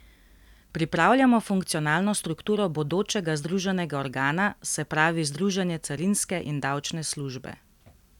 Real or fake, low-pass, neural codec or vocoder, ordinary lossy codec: real; 19.8 kHz; none; none